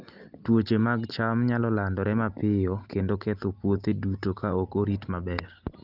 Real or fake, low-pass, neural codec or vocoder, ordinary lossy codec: real; 5.4 kHz; none; Opus, 24 kbps